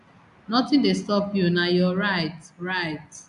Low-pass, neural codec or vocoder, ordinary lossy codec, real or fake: 10.8 kHz; none; none; real